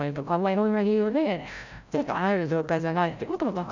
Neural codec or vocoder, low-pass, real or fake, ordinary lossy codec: codec, 16 kHz, 0.5 kbps, FreqCodec, larger model; 7.2 kHz; fake; none